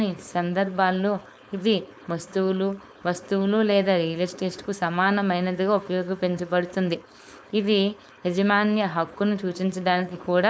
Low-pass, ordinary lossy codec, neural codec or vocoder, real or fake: none; none; codec, 16 kHz, 4.8 kbps, FACodec; fake